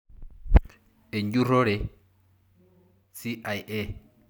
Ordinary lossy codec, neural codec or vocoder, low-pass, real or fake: none; none; 19.8 kHz; real